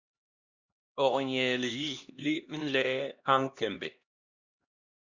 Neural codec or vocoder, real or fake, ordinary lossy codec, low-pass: codec, 16 kHz, 1 kbps, X-Codec, HuBERT features, trained on LibriSpeech; fake; Opus, 64 kbps; 7.2 kHz